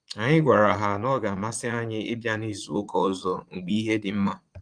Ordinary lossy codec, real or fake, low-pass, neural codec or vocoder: Opus, 32 kbps; fake; 9.9 kHz; vocoder, 22.05 kHz, 80 mel bands, Vocos